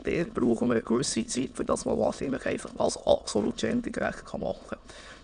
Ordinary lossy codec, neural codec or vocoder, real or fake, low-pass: none; autoencoder, 22.05 kHz, a latent of 192 numbers a frame, VITS, trained on many speakers; fake; 9.9 kHz